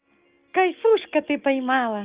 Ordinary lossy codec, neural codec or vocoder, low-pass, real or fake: Opus, 32 kbps; none; 3.6 kHz; real